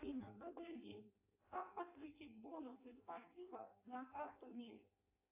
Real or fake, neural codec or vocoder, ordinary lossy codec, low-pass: fake; codec, 16 kHz in and 24 kHz out, 0.6 kbps, FireRedTTS-2 codec; AAC, 32 kbps; 3.6 kHz